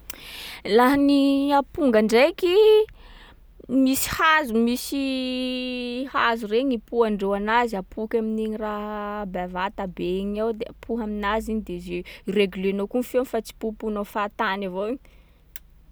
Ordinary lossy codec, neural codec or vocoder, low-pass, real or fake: none; none; none; real